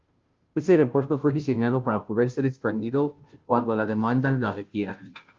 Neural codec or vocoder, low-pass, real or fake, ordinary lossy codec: codec, 16 kHz, 0.5 kbps, FunCodec, trained on Chinese and English, 25 frames a second; 7.2 kHz; fake; Opus, 24 kbps